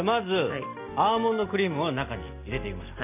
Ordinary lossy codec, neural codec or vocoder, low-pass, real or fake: none; none; 3.6 kHz; real